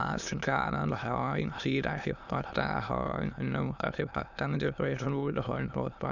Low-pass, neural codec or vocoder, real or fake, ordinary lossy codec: 7.2 kHz; autoencoder, 22.05 kHz, a latent of 192 numbers a frame, VITS, trained on many speakers; fake; none